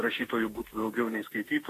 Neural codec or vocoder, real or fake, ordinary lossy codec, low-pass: autoencoder, 48 kHz, 32 numbers a frame, DAC-VAE, trained on Japanese speech; fake; AAC, 48 kbps; 14.4 kHz